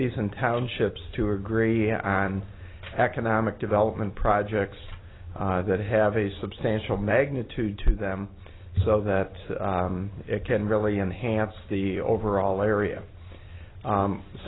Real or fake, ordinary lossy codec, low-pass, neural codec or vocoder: real; AAC, 16 kbps; 7.2 kHz; none